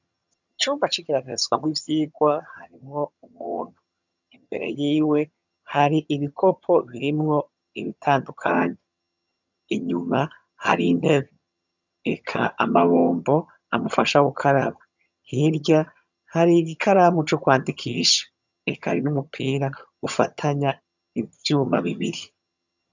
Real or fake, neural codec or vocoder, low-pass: fake; vocoder, 22.05 kHz, 80 mel bands, HiFi-GAN; 7.2 kHz